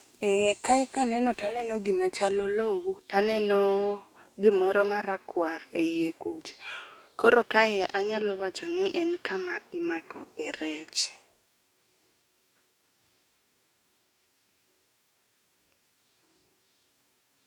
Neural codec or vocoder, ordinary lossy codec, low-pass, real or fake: codec, 44.1 kHz, 2.6 kbps, DAC; none; 19.8 kHz; fake